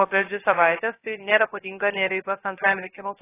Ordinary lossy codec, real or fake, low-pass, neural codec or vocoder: AAC, 16 kbps; fake; 3.6 kHz; codec, 16 kHz, 0.3 kbps, FocalCodec